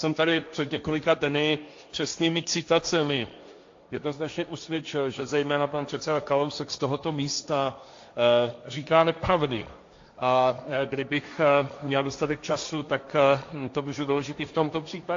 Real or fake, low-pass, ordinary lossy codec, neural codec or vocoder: fake; 7.2 kHz; AAC, 48 kbps; codec, 16 kHz, 1.1 kbps, Voila-Tokenizer